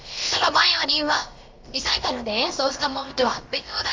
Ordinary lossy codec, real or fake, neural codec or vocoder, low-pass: Opus, 32 kbps; fake; codec, 16 kHz, about 1 kbps, DyCAST, with the encoder's durations; 7.2 kHz